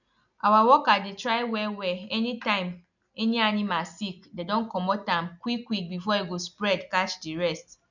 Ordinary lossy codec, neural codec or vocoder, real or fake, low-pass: none; none; real; 7.2 kHz